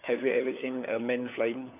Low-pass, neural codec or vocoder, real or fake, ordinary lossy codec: 3.6 kHz; codec, 16 kHz, 4 kbps, FunCodec, trained on LibriTTS, 50 frames a second; fake; none